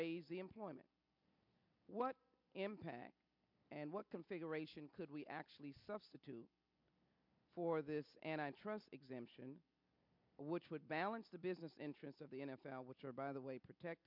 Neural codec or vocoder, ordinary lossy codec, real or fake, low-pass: none; MP3, 48 kbps; real; 5.4 kHz